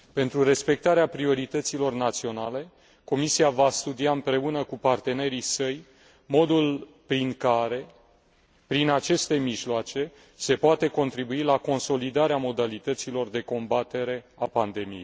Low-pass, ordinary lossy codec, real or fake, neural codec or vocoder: none; none; real; none